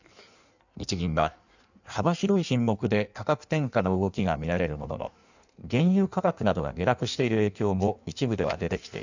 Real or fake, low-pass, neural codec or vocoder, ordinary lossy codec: fake; 7.2 kHz; codec, 16 kHz in and 24 kHz out, 1.1 kbps, FireRedTTS-2 codec; none